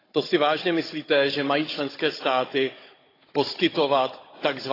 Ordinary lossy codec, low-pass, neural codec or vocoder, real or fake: AAC, 24 kbps; 5.4 kHz; codec, 16 kHz, 16 kbps, FunCodec, trained on Chinese and English, 50 frames a second; fake